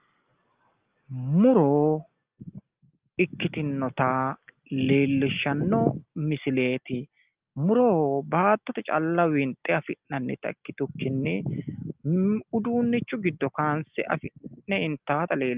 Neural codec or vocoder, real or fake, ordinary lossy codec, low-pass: none; real; Opus, 32 kbps; 3.6 kHz